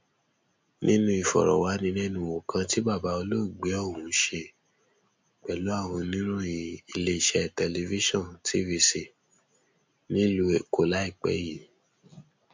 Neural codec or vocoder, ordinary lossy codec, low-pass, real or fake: none; MP3, 48 kbps; 7.2 kHz; real